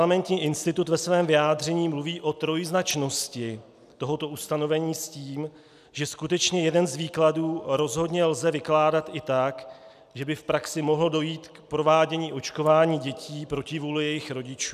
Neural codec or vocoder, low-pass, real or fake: none; 14.4 kHz; real